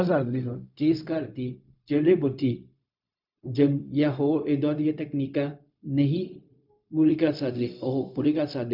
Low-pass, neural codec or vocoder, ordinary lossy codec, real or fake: 5.4 kHz; codec, 16 kHz, 0.4 kbps, LongCat-Audio-Codec; none; fake